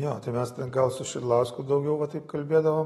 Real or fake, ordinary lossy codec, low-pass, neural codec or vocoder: real; AAC, 32 kbps; 19.8 kHz; none